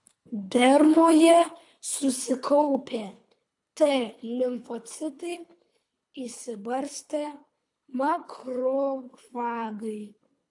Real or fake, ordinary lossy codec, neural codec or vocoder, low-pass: fake; AAC, 64 kbps; codec, 24 kHz, 3 kbps, HILCodec; 10.8 kHz